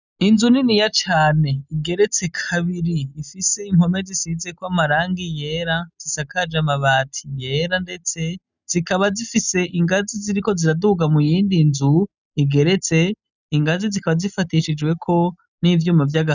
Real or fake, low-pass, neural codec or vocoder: real; 7.2 kHz; none